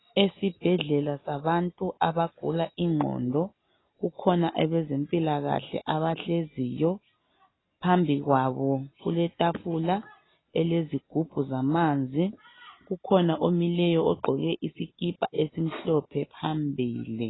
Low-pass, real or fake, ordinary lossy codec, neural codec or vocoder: 7.2 kHz; real; AAC, 16 kbps; none